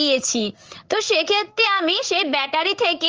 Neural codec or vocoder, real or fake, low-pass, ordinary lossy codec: codec, 16 kHz in and 24 kHz out, 1 kbps, XY-Tokenizer; fake; 7.2 kHz; Opus, 24 kbps